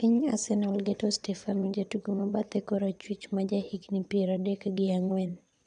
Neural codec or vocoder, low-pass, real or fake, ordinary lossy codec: vocoder, 22.05 kHz, 80 mel bands, WaveNeXt; 9.9 kHz; fake; none